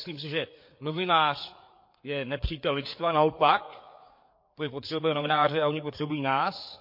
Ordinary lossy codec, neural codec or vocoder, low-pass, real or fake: MP3, 32 kbps; codec, 16 kHz, 4 kbps, FreqCodec, larger model; 5.4 kHz; fake